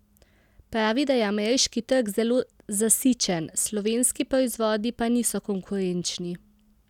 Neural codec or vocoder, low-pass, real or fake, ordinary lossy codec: none; 19.8 kHz; real; none